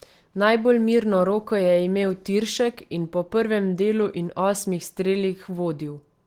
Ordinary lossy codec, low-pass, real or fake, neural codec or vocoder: Opus, 16 kbps; 19.8 kHz; real; none